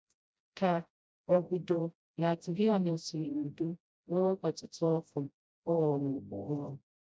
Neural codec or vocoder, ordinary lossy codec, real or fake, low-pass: codec, 16 kHz, 0.5 kbps, FreqCodec, smaller model; none; fake; none